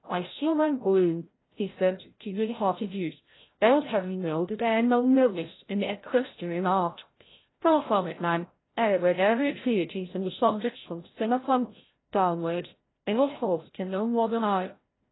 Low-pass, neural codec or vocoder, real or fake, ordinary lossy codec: 7.2 kHz; codec, 16 kHz, 0.5 kbps, FreqCodec, larger model; fake; AAC, 16 kbps